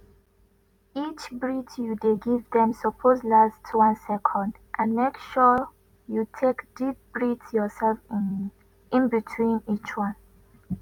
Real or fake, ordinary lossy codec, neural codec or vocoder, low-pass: fake; none; vocoder, 44.1 kHz, 128 mel bands every 256 samples, BigVGAN v2; 19.8 kHz